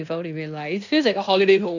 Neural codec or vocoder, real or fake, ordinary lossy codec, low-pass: codec, 16 kHz in and 24 kHz out, 0.9 kbps, LongCat-Audio-Codec, fine tuned four codebook decoder; fake; none; 7.2 kHz